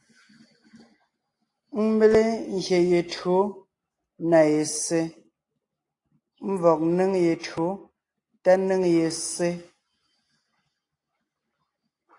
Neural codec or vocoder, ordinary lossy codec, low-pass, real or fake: none; AAC, 48 kbps; 10.8 kHz; real